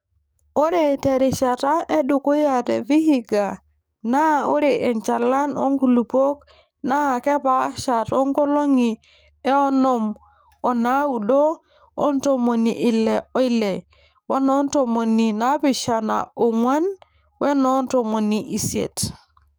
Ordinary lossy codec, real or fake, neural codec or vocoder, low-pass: none; fake; codec, 44.1 kHz, 7.8 kbps, DAC; none